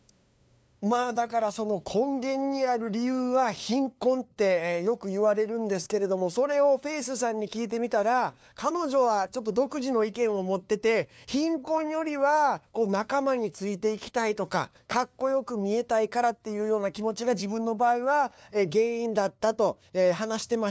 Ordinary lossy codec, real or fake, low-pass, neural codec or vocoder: none; fake; none; codec, 16 kHz, 2 kbps, FunCodec, trained on LibriTTS, 25 frames a second